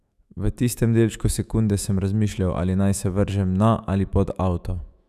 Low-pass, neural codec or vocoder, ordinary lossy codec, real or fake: 14.4 kHz; autoencoder, 48 kHz, 128 numbers a frame, DAC-VAE, trained on Japanese speech; none; fake